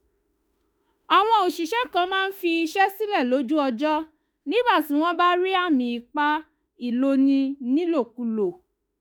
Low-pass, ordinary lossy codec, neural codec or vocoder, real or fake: none; none; autoencoder, 48 kHz, 32 numbers a frame, DAC-VAE, trained on Japanese speech; fake